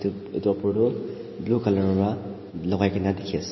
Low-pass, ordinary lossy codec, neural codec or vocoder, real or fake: 7.2 kHz; MP3, 24 kbps; none; real